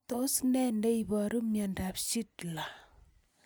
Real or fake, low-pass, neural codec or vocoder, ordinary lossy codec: real; none; none; none